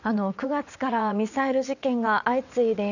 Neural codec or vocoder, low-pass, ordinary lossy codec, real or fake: vocoder, 22.05 kHz, 80 mel bands, WaveNeXt; 7.2 kHz; none; fake